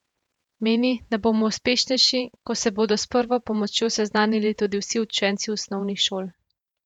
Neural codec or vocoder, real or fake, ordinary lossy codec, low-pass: vocoder, 48 kHz, 128 mel bands, Vocos; fake; none; 19.8 kHz